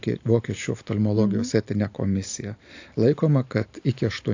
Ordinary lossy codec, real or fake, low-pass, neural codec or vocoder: AAC, 48 kbps; real; 7.2 kHz; none